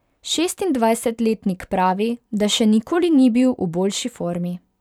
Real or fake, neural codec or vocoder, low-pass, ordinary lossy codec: fake; vocoder, 44.1 kHz, 128 mel bands every 512 samples, BigVGAN v2; 19.8 kHz; none